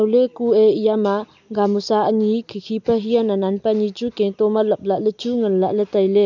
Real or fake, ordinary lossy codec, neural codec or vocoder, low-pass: real; none; none; 7.2 kHz